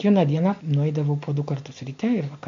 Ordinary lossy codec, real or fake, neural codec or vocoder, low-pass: MP3, 48 kbps; real; none; 7.2 kHz